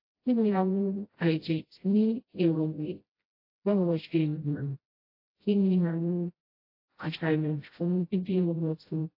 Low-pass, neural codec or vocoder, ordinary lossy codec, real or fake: 5.4 kHz; codec, 16 kHz, 0.5 kbps, FreqCodec, smaller model; AAC, 32 kbps; fake